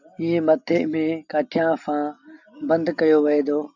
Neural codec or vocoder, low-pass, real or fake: none; 7.2 kHz; real